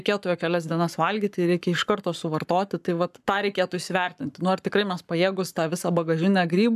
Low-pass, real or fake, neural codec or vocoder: 14.4 kHz; fake; codec, 44.1 kHz, 7.8 kbps, Pupu-Codec